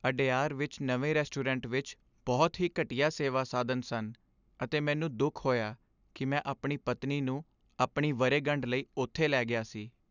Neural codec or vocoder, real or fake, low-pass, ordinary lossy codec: none; real; 7.2 kHz; none